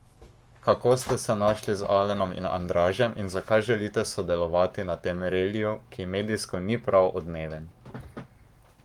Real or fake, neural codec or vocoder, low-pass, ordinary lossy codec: fake; codec, 44.1 kHz, 7.8 kbps, Pupu-Codec; 19.8 kHz; Opus, 32 kbps